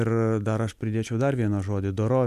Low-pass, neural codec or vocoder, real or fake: 14.4 kHz; none; real